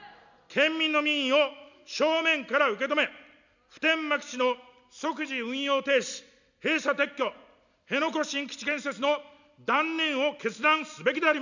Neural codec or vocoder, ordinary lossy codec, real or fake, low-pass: none; none; real; 7.2 kHz